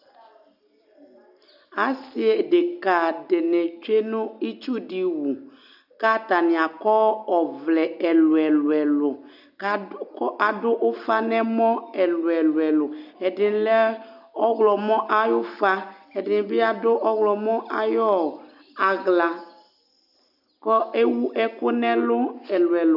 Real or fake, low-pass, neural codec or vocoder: real; 5.4 kHz; none